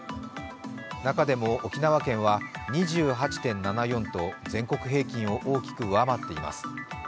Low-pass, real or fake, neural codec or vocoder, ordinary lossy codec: none; real; none; none